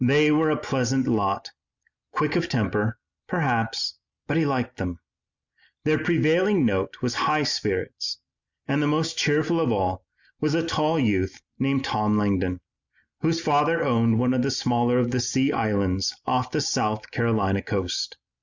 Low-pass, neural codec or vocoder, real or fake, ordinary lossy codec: 7.2 kHz; none; real; Opus, 64 kbps